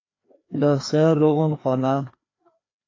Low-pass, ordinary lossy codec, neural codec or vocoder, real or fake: 7.2 kHz; AAC, 32 kbps; codec, 16 kHz, 2 kbps, FreqCodec, larger model; fake